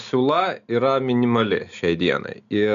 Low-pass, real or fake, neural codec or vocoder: 7.2 kHz; real; none